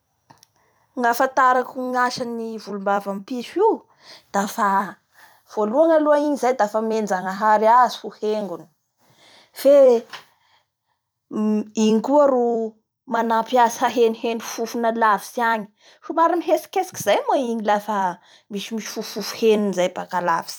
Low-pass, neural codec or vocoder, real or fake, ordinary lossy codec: none; vocoder, 44.1 kHz, 128 mel bands every 256 samples, BigVGAN v2; fake; none